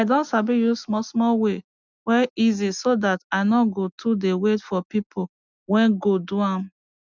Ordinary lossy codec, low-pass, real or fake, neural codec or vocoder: none; 7.2 kHz; real; none